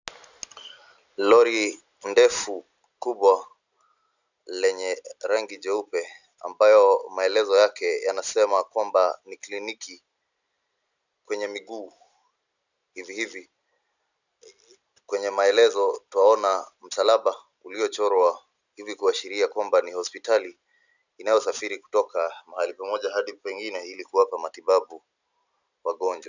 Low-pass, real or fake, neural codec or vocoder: 7.2 kHz; real; none